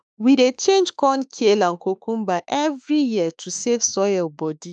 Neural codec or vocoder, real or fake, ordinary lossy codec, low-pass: autoencoder, 48 kHz, 32 numbers a frame, DAC-VAE, trained on Japanese speech; fake; none; 9.9 kHz